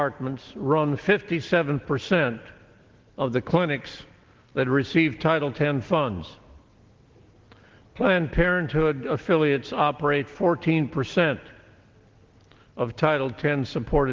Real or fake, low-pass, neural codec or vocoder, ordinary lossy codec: real; 7.2 kHz; none; Opus, 16 kbps